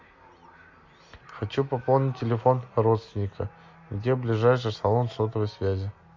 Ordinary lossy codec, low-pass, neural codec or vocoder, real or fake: MP3, 48 kbps; 7.2 kHz; none; real